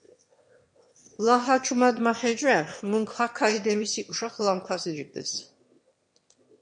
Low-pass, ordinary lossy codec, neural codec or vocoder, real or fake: 9.9 kHz; MP3, 48 kbps; autoencoder, 22.05 kHz, a latent of 192 numbers a frame, VITS, trained on one speaker; fake